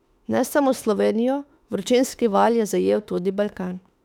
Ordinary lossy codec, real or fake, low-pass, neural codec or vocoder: none; fake; 19.8 kHz; autoencoder, 48 kHz, 32 numbers a frame, DAC-VAE, trained on Japanese speech